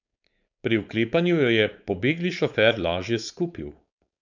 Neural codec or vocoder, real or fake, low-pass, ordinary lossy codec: codec, 16 kHz, 4.8 kbps, FACodec; fake; 7.2 kHz; none